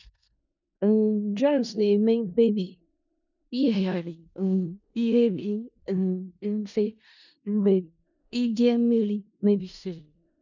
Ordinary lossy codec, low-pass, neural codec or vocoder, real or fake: none; 7.2 kHz; codec, 16 kHz in and 24 kHz out, 0.4 kbps, LongCat-Audio-Codec, four codebook decoder; fake